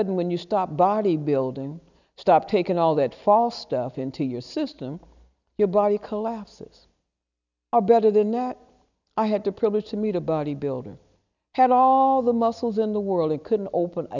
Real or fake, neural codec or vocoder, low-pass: real; none; 7.2 kHz